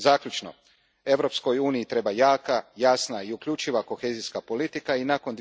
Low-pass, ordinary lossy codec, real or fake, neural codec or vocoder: none; none; real; none